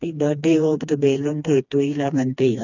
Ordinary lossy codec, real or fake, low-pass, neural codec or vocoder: none; fake; 7.2 kHz; codec, 16 kHz, 2 kbps, FreqCodec, smaller model